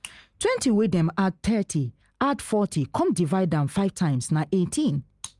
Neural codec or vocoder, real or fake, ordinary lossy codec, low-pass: none; real; Opus, 32 kbps; 10.8 kHz